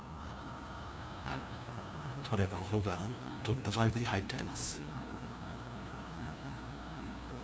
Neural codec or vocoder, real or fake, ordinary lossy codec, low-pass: codec, 16 kHz, 0.5 kbps, FunCodec, trained on LibriTTS, 25 frames a second; fake; none; none